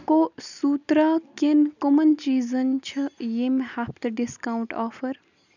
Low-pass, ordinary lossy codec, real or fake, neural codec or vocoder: 7.2 kHz; none; real; none